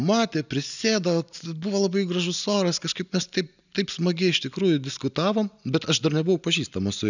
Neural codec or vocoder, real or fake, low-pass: none; real; 7.2 kHz